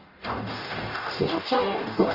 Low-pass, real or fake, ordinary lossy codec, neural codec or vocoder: 5.4 kHz; fake; Opus, 24 kbps; codec, 44.1 kHz, 0.9 kbps, DAC